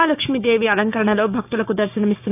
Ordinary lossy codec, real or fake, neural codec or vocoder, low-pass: none; fake; codec, 16 kHz, 6 kbps, DAC; 3.6 kHz